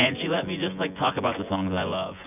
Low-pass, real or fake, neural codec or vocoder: 3.6 kHz; fake; vocoder, 24 kHz, 100 mel bands, Vocos